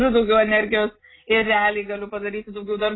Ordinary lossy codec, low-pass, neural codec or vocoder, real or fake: AAC, 16 kbps; 7.2 kHz; none; real